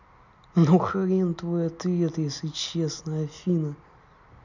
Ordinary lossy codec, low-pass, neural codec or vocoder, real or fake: none; 7.2 kHz; none; real